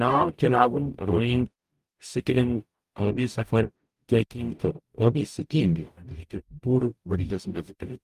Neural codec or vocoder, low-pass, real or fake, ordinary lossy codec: codec, 44.1 kHz, 0.9 kbps, DAC; 14.4 kHz; fake; Opus, 32 kbps